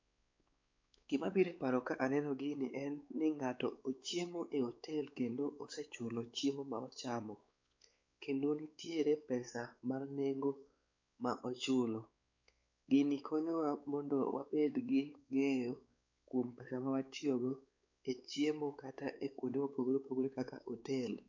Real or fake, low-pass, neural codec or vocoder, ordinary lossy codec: fake; 7.2 kHz; codec, 16 kHz, 4 kbps, X-Codec, WavLM features, trained on Multilingual LibriSpeech; MP3, 64 kbps